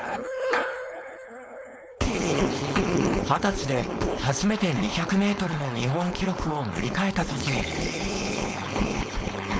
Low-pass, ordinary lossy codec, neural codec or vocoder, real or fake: none; none; codec, 16 kHz, 4.8 kbps, FACodec; fake